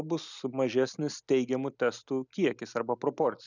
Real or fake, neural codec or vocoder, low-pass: real; none; 7.2 kHz